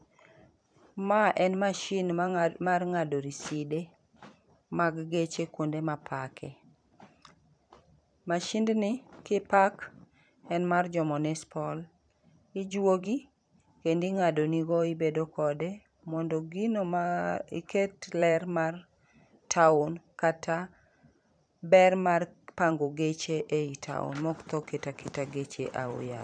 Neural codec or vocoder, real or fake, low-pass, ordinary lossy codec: vocoder, 44.1 kHz, 128 mel bands every 512 samples, BigVGAN v2; fake; 9.9 kHz; none